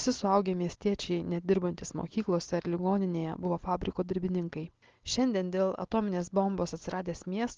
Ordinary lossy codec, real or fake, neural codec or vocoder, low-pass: Opus, 16 kbps; real; none; 7.2 kHz